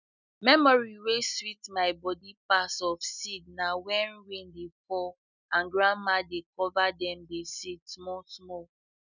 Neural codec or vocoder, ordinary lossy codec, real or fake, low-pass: none; none; real; 7.2 kHz